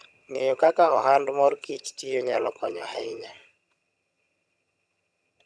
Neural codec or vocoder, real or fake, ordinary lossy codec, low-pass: vocoder, 22.05 kHz, 80 mel bands, HiFi-GAN; fake; none; none